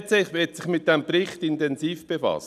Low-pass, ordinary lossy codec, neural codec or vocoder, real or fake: 14.4 kHz; none; none; real